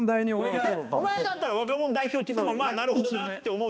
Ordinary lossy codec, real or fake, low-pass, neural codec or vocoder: none; fake; none; codec, 16 kHz, 2 kbps, X-Codec, HuBERT features, trained on balanced general audio